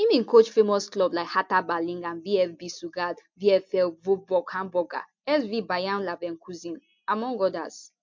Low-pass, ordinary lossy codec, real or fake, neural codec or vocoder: 7.2 kHz; MP3, 48 kbps; real; none